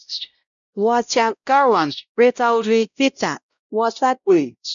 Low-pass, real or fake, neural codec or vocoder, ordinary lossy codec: 7.2 kHz; fake; codec, 16 kHz, 0.5 kbps, X-Codec, WavLM features, trained on Multilingual LibriSpeech; MP3, 96 kbps